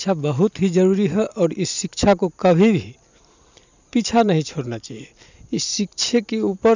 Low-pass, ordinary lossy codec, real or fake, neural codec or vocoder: 7.2 kHz; none; fake; vocoder, 44.1 kHz, 128 mel bands every 512 samples, BigVGAN v2